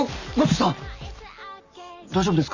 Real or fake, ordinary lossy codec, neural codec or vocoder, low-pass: real; none; none; 7.2 kHz